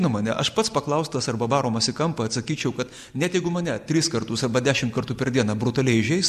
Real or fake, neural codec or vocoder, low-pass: real; none; 14.4 kHz